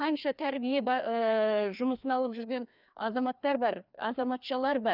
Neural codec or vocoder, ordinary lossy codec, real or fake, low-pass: codec, 16 kHz, 2 kbps, FreqCodec, larger model; none; fake; 5.4 kHz